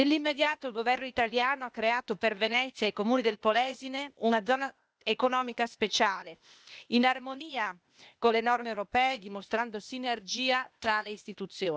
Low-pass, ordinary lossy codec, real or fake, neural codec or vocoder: none; none; fake; codec, 16 kHz, 0.8 kbps, ZipCodec